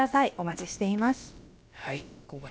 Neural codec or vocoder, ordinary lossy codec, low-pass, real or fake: codec, 16 kHz, about 1 kbps, DyCAST, with the encoder's durations; none; none; fake